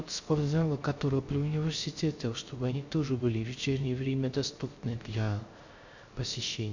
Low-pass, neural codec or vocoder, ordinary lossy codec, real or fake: 7.2 kHz; codec, 16 kHz, 0.3 kbps, FocalCodec; Opus, 64 kbps; fake